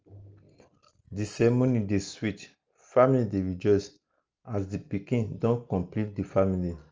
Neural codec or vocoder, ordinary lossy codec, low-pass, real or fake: none; Opus, 24 kbps; 7.2 kHz; real